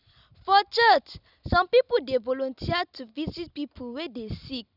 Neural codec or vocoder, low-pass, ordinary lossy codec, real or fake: none; 5.4 kHz; none; real